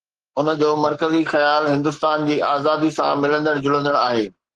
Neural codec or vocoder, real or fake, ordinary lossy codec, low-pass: codec, 44.1 kHz, 7.8 kbps, Pupu-Codec; fake; Opus, 16 kbps; 10.8 kHz